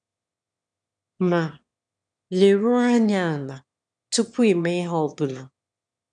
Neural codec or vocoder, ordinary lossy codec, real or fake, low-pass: autoencoder, 22.05 kHz, a latent of 192 numbers a frame, VITS, trained on one speaker; none; fake; 9.9 kHz